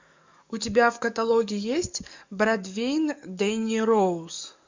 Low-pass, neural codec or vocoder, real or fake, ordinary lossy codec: 7.2 kHz; codec, 44.1 kHz, 7.8 kbps, DAC; fake; MP3, 64 kbps